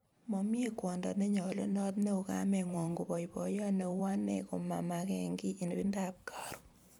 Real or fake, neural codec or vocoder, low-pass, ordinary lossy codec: fake; vocoder, 44.1 kHz, 128 mel bands every 512 samples, BigVGAN v2; none; none